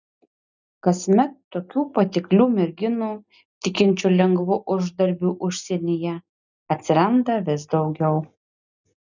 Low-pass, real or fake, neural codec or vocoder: 7.2 kHz; real; none